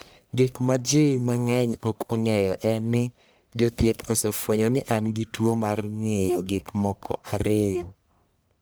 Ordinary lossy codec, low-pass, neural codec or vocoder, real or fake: none; none; codec, 44.1 kHz, 1.7 kbps, Pupu-Codec; fake